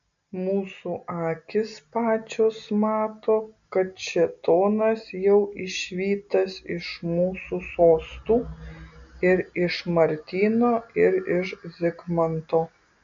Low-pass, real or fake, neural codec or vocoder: 7.2 kHz; real; none